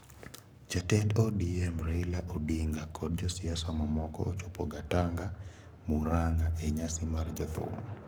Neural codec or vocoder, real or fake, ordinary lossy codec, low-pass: codec, 44.1 kHz, 7.8 kbps, Pupu-Codec; fake; none; none